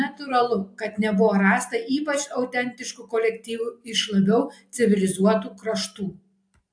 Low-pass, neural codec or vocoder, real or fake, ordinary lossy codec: 9.9 kHz; none; real; AAC, 64 kbps